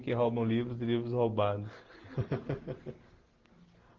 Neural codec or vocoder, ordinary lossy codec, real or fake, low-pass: none; Opus, 16 kbps; real; 7.2 kHz